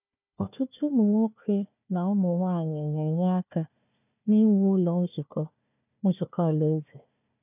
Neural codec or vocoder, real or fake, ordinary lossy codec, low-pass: codec, 16 kHz, 1 kbps, FunCodec, trained on Chinese and English, 50 frames a second; fake; none; 3.6 kHz